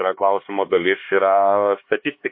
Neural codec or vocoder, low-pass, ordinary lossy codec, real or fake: codec, 16 kHz, 2 kbps, X-Codec, HuBERT features, trained on LibriSpeech; 5.4 kHz; MP3, 32 kbps; fake